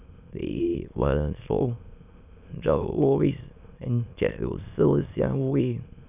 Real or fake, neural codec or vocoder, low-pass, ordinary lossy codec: fake; autoencoder, 22.05 kHz, a latent of 192 numbers a frame, VITS, trained on many speakers; 3.6 kHz; none